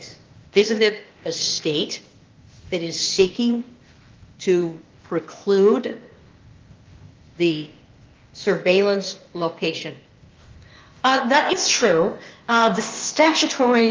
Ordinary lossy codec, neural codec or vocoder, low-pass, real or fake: Opus, 24 kbps; codec, 16 kHz, 0.8 kbps, ZipCodec; 7.2 kHz; fake